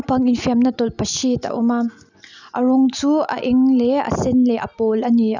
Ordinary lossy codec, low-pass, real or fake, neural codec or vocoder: none; 7.2 kHz; real; none